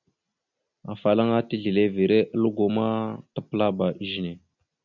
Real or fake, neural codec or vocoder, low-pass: real; none; 7.2 kHz